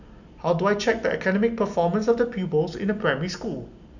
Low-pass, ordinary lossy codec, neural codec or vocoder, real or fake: 7.2 kHz; none; none; real